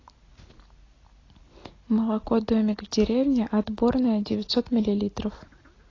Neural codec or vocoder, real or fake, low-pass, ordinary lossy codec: none; real; 7.2 kHz; AAC, 32 kbps